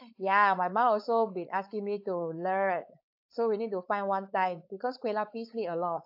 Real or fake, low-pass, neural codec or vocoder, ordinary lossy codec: fake; 5.4 kHz; codec, 16 kHz, 4.8 kbps, FACodec; AAC, 48 kbps